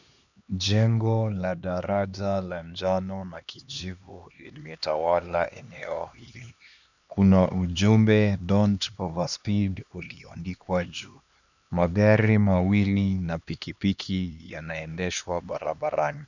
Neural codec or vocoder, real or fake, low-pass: codec, 16 kHz, 2 kbps, X-Codec, HuBERT features, trained on LibriSpeech; fake; 7.2 kHz